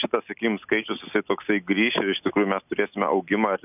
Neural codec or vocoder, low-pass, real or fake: none; 3.6 kHz; real